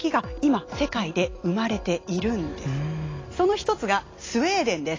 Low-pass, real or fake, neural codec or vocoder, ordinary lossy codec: 7.2 kHz; real; none; AAC, 32 kbps